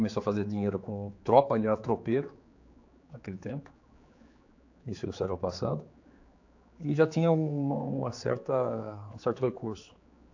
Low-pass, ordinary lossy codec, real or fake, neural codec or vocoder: 7.2 kHz; AAC, 48 kbps; fake; codec, 16 kHz, 4 kbps, X-Codec, HuBERT features, trained on general audio